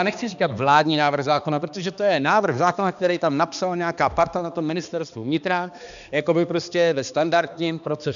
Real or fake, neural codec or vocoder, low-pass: fake; codec, 16 kHz, 2 kbps, X-Codec, HuBERT features, trained on balanced general audio; 7.2 kHz